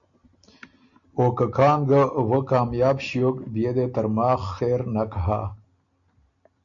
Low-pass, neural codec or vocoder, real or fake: 7.2 kHz; none; real